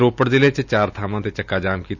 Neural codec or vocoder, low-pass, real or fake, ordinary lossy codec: none; 7.2 kHz; real; Opus, 64 kbps